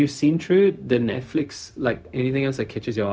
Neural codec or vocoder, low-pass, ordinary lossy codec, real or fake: codec, 16 kHz, 0.4 kbps, LongCat-Audio-Codec; none; none; fake